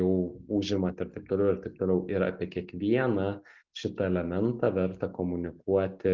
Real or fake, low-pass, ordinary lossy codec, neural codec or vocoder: real; 7.2 kHz; Opus, 24 kbps; none